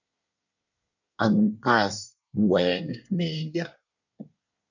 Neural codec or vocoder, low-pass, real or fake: codec, 24 kHz, 1 kbps, SNAC; 7.2 kHz; fake